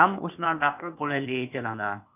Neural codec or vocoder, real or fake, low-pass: codec, 16 kHz, 0.8 kbps, ZipCodec; fake; 3.6 kHz